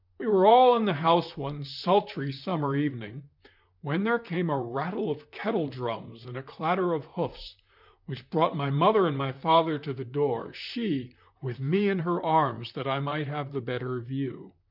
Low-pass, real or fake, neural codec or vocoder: 5.4 kHz; fake; vocoder, 44.1 kHz, 128 mel bands, Pupu-Vocoder